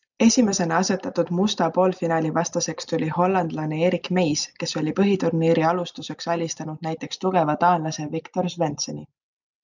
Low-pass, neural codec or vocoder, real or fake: 7.2 kHz; none; real